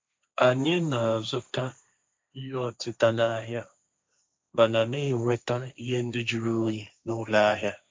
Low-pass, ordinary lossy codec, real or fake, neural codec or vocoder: none; none; fake; codec, 16 kHz, 1.1 kbps, Voila-Tokenizer